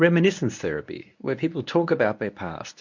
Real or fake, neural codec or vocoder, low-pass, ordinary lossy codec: fake; codec, 24 kHz, 0.9 kbps, WavTokenizer, medium speech release version 1; 7.2 kHz; MP3, 48 kbps